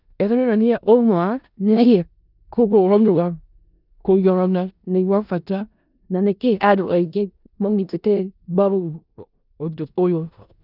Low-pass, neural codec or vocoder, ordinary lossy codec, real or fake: 5.4 kHz; codec, 16 kHz in and 24 kHz out, 0.4 kbps, LongCat-Audio-Codec, four codebook decoder; none; fake